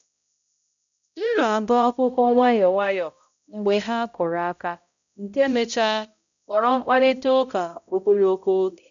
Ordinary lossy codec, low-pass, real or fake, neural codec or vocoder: none; 7.2 kHz; fake; codec, 16 kHz, 0.5 kbps, X-Codec, HuBERT features, trained on balanced general audio